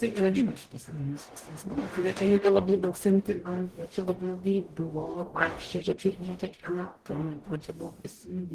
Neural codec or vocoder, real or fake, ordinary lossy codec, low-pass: codec, 44.1 kHz, 0.9 kbps, DAC; fake; Opus, 16 kbps; 14.4 kHz